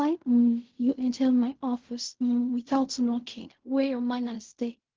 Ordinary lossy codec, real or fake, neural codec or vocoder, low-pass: Opus, 16 kbps; fake; codec, 16 kHz in and 24 kHz out, 0.4 kbps, LongCat-Audio-Codec, fine tuned four codebook decoder; 7.2 kHz